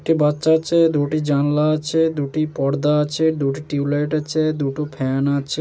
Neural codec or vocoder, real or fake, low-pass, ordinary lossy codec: none; real; none; none